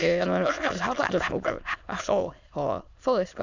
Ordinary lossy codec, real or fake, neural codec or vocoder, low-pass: Opus, 64 kbps; fake; autoencoder, 22.05 kHz, a latent of 192 numbers a frame, VITS, trained on many speakers; 7.2 kHz